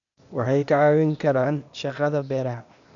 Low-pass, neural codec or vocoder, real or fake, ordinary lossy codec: 7.2 kHz; codec, 16 kHz, 0.8 kbps, ZipCodec; fake; none